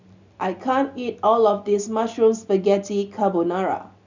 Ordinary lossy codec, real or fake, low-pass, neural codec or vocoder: none; real; 7.2 kHz; none